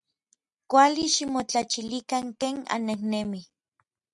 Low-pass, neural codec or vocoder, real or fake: 10.8 kHz; none; real